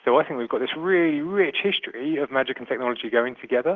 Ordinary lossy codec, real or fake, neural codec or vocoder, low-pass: Opus, 16 kbps; real; none; 7.2 kHz